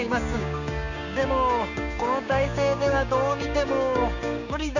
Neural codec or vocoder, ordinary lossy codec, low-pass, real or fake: codec, 16 kHz, 6 kbps, DAC; none; 7.2 kHz; fake